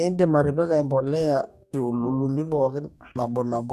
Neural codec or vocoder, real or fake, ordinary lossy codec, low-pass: codec, 44.1 kHz, 2.6 kbps, DAC; fake; none; 14.4 kHz